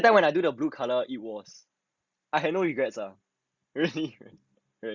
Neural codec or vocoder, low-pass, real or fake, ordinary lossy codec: none; 7.2 kHz; real; Opus, 64 kbps